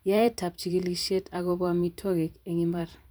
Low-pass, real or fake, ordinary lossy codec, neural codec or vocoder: none; fake; none; vocoder, 44.1 kHz, 128 mel bands every 512 samples, BigVGAN v2